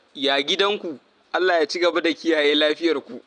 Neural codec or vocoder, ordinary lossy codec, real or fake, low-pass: none; none; real; 9.9 kHz